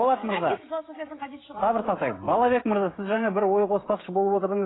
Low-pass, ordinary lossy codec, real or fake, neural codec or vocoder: 7.2 kHz; AAC, 16 kbps; fake; codec, 16 kHz, 16 kbps, FreqCodec, smaller model